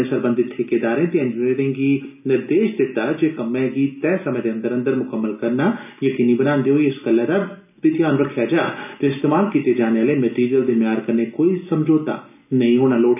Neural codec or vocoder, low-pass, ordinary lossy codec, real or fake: none; 3.6 kHz; none; real